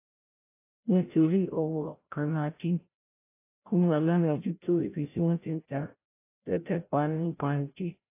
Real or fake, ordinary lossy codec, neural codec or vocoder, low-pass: fake; AAC, 24 kbps; codec, 16 kHz, 0.5 kbps, FreqCodec, larger model; 3.6 kHz